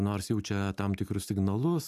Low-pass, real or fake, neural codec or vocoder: 14.4 kHz; real; none